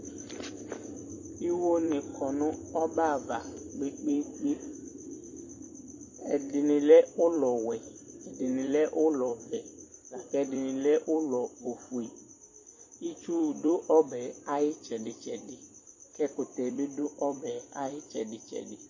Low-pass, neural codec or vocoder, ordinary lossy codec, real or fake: 7.2 kHz; vocoder, 44.1 kHz, 128 mel bands every 256 samples, BigVGAN v2; MP3, 32 kbps; fake